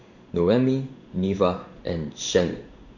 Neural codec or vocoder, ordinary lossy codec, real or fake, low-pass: codec, 16 kHz in and 24 kHz out, 1 kbps, XY-Tokenizer; none; fake; 7.2 kHz